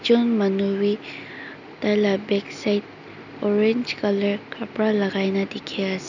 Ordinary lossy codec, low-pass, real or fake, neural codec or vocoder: none; 7.2 kHz; real; none